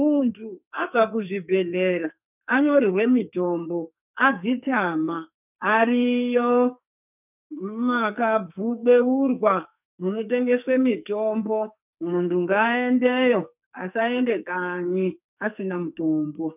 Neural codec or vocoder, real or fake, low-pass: codec, 44.1 kHz, 2.6 kbps, SNAC; fake; 3.6 kHz